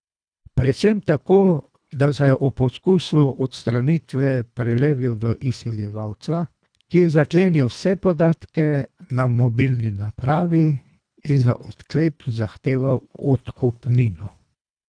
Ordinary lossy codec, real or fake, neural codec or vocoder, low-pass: none; fake; codec, 24 kHz, 1.5 kbps, HILCodec; 9.9 kHz